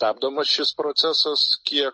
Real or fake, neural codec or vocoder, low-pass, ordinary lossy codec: real; none; 9.9 kHz; MP3, 32 kbps